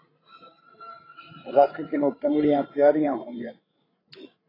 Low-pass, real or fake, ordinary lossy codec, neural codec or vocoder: 5.4 kHz; fake; AAC, 24 kbps; codec, 16 kHz, 8 kbps, FreqCodec, larger model